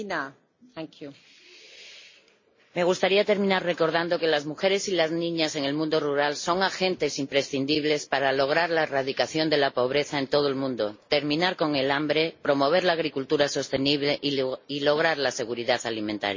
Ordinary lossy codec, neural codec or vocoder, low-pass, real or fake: MP3, 32 kbps; none; 7.2 kHz; real